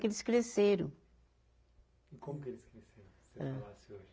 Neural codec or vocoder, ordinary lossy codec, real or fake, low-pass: none; none; real; none